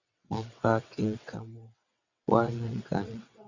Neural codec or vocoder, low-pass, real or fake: vocoder, 22.05 kHz, 80 mel bands, WaveNeXt; 7.2 kHz; fake